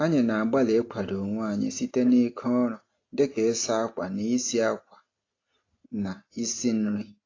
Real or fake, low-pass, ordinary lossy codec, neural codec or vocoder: real; 7.2 kHz; AAC, 32 kbps; none